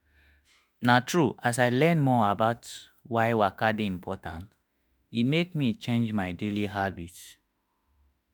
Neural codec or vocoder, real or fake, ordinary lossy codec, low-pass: autoencoder, 48 kHz, 32 numbers a frame, DAC-VAE, trained on Japanese speech; fake; none; none